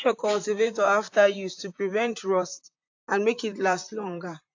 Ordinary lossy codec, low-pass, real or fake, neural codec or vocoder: AAC, 48 kbps; 7.2 kHz; fake; vocoder, 44.1 kHz, 128 mel bands, Pupu-Vocoder